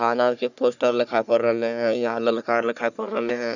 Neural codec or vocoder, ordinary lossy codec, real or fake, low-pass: codec, 44.1 kHz, 3.4 kbps, Pupu-Codec; none; fake; 7.2 kHz